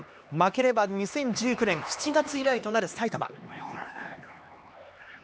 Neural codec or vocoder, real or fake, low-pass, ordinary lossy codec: codec, 16 kHz, 2 kbps, X-Codec, HuBERT features, trained on LibriSpeech; fake; none; none